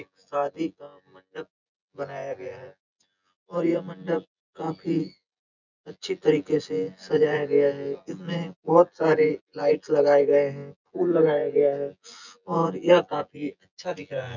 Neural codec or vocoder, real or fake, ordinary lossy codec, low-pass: vocoder, 24 kHz, 100 mel bands, Vocos; fake; none; 7.2 kHz